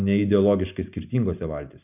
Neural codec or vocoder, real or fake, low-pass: none; real; 3.6 kHz